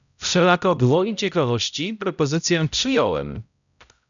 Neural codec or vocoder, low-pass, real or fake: codec, 16 kHz, 0.5 kbps, X-Codec, HuBERT features, trained on balanced general audio; 7.2 kHz; fake